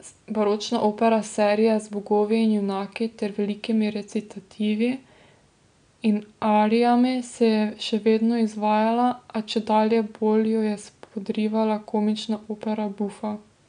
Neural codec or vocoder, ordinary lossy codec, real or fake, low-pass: none; none; real; 9.9 kHz